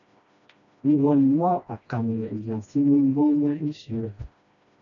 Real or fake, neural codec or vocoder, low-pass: fake; codec, 16 kHz, 1 kbps, FreqCodec, smaller model; 7.2 kHz